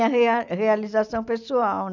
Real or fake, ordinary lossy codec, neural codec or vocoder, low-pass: real; none; none; 7.2 kHz